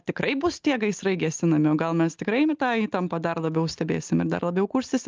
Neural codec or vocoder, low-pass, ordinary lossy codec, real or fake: none; 7.2 kHz; Opus, 24 kbps; real